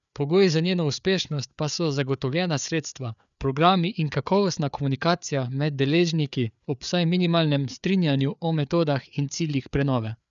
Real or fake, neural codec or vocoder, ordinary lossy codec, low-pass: fake; codec, 16 kHz, 4 kbps, FreqCodec, larger model; none; 7.2 kHz